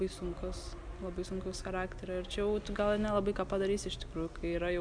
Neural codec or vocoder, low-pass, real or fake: none; 9.9 kHz; real